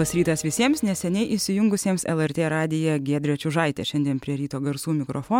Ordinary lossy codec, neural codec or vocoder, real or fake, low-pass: MP3, 96 kbps; none; real; 19.8 kHz